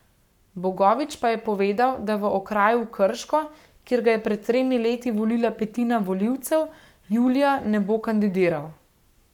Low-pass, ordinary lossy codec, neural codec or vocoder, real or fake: 19.8 kHz; none; codec, 44.1 kHz, 7.8 kbps, Pupu-Codec; fake